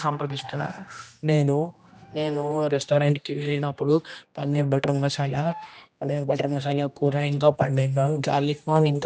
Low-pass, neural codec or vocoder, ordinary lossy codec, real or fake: none; codec, 16 kHz, 1 kbps, X-Codec, HuBERT features, trained on general audio; none; fake